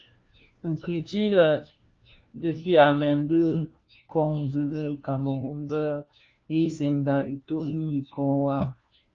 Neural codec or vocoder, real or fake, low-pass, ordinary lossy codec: codec, 16 kHz, 1 kbps, FunCodec, trained on LibriTTS, 50 frames a second; fake; 7.2 kHz; Opus, 24 kbps